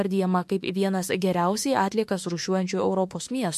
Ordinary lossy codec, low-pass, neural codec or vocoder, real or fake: MP3, 64 kbps; 14.4 kHz; autoencoder, 48 kHz, 32 numbers a frame, DAC-VAE, trained on Japanese speech; fake